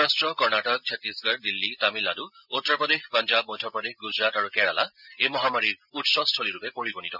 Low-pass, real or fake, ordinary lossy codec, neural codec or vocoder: 5.4 kHz; real; none; none